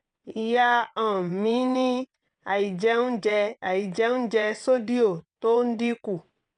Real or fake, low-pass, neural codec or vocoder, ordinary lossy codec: fake; 9.9 kHz; vocoder, 22.05 kHz, 80 mel bands, WaveNeXt; none